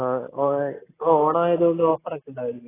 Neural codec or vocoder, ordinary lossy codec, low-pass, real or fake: none; AAC, 16 kbps; 3.6 kHz; real